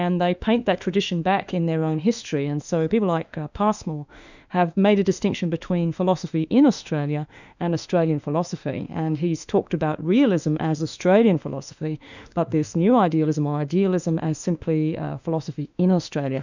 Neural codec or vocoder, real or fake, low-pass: autoencoder, 48 kHz, 32 numbers a frame, DAC-VAE, trained on Japanese speech; fake; 7.2 kHz